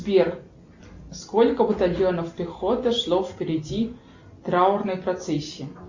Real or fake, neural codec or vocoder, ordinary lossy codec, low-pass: real; none; Opus, 64 kbps; 7.2 kHz